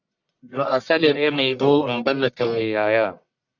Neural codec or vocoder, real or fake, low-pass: codec, 44.1 kHz, 1.7 kbps, Pupu-Codec; fake; 7.2 kHz